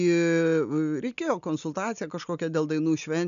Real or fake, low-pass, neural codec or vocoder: real; 7.2 kHz; none